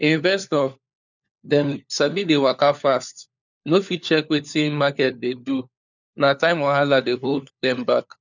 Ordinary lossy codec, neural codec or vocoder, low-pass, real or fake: none; codec, 16 kHz, 4 kbps, FunCodec, trained on LibriTTS, 50 frames a second; 7.2 kHz; fake